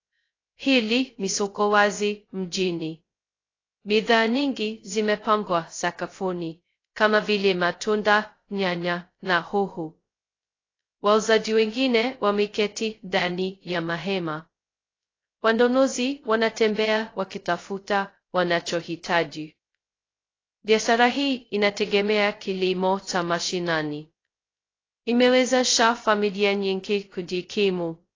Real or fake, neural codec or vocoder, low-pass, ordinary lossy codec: fake; codec, 16 kHz, 0.2 kbps, FocalCodec; 7.2 kHz; AAC, 32 kbps